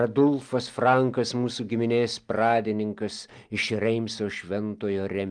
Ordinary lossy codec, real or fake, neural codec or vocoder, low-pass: Opus, 32 kbps; real; none; 9.9 kHz